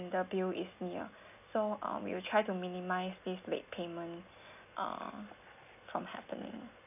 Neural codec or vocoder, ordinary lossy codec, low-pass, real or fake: none; none; 3.6 kHz; real